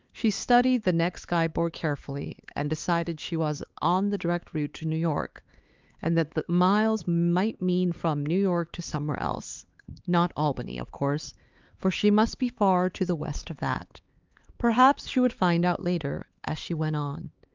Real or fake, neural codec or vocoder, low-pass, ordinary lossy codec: fake; codec, 16 kHz, 4 kbps, X-Codec, HuBERT features, trained on LibriSpeech; 7.2 kHz; Opus, 32 kbps